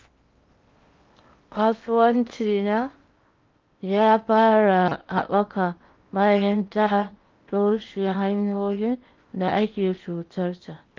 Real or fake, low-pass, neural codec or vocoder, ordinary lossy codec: fake; 7.2 kHz; codec, 16 kHz in and 24 kHz out, 0.8 kbps, FocalCodec, streaming, 65536 codes; Opus, 32 kbps